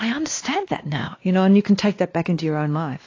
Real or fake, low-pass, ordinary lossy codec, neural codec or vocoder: fake; 7.2 kHz; AAC, 32 kbps; codec, 16 kHz, 2 kbps, X-Codec, WavLM features, trained on Multilingual LibriSpeech